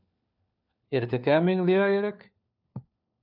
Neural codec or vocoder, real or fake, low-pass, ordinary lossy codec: codec, 16 kHz, 4 kbps, FunCodec, trained on LibriTTS, 50 frames a second; fake; 5.4 kHz; MP3, 48 kbps